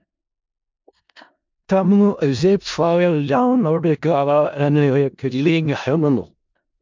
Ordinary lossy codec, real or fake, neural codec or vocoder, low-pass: MP3, 64 kbps; fake; codec, 16 kHz in and 24 kHz out, 0.4 kbps, LongCat-Audio-Codec, four codebook decoder; 7.2 kHz